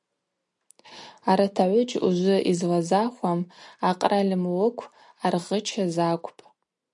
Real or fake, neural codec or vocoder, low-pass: real; none; 10.8 kHz